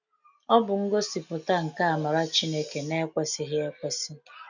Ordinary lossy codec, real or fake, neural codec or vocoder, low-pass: none; real; none; 7.2 kHz